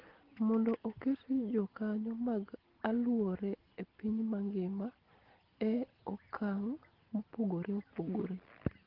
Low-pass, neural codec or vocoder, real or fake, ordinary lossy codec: 5.4 kHz; none; real; Opus, 16 kbps